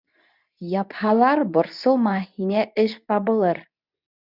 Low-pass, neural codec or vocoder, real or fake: 5.4 kHz; codec, 24 kHz, 0.9 kbps, WavTokenizer, medium speech release version 2; fake